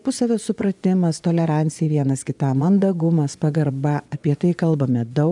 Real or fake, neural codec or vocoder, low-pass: fake; vocoder, 24 kHz, 100 mel bands, Vocos; 10.8 kHz